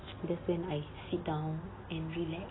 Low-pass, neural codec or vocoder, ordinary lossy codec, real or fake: 7.2 kHz; none; AAC, 16 kbps; real